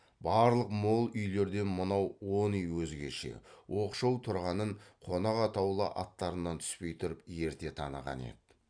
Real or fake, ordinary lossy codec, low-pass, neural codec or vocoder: real; MP3, 96 kbps; 9.9 kHz; none